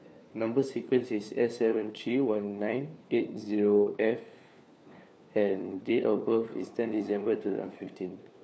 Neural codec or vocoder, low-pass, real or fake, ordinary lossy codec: codec, 16 kHz, 4 kbps, FunCodec, trained on LibriTTS, 50 frames a second; none; fake; none